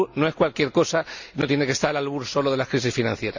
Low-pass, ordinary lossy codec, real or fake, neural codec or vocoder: 7.2 kHz; none; real; none